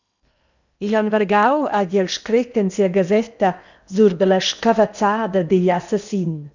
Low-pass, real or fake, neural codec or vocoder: 7.2 kHz; fake; codec, 16 kHz in and 24 kHz out, 0.8 kbps, FocalCodec, streaming, 65536 codes